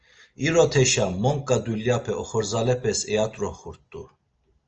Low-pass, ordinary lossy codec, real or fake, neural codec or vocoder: 7.2 kHz; Opus, 24 kbps; real; none